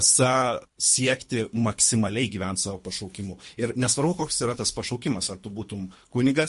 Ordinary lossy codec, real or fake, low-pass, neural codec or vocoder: MP3, 48 kbps; fake; 10.8 kHz; codec, 24 kHz, 3 kbps, HILCodec